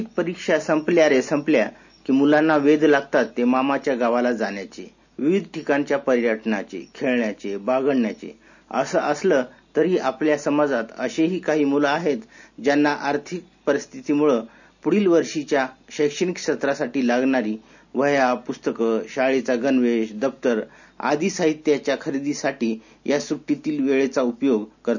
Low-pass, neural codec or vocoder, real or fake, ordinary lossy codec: 7.2 kHz; none; real; none